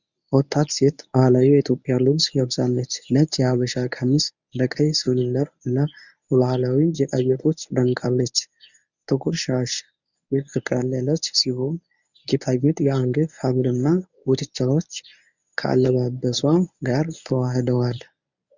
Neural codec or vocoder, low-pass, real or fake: codec, 24 kHz, 0.9 kbps, WavTokenizer, medium speech release version 1; 7.2 kHz; fake